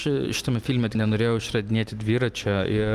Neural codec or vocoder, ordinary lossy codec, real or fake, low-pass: vocoder, 44.1 kHz, 128 mel bands every 512 samples, BigVGAN v2; Opus, 32 kbps; fake; 14.4 kHz